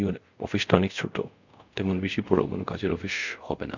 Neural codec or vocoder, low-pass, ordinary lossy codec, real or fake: codec, 24 kHz, 0.5 kbps, DualCodec; 7.2 kHz; none; fake